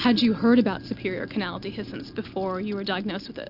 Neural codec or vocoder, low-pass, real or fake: none; 5.4 kHz; real